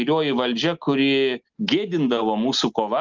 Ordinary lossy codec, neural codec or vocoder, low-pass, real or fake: Opus, 32 kbps; none; 7.2 kHz; real